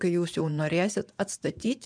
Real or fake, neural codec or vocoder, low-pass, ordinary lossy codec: fake; vocoder, 24 kHz, 100 mel bands, Vocos; 9.9 kHz; MP3, 96 kbps